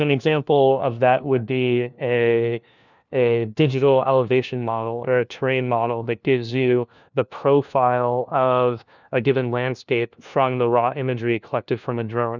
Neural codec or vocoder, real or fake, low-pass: codec, 16 kHz, 1 kbps, FunCodec, trained on LibriTTS, 50 frames a second; fake; 7.2 kHz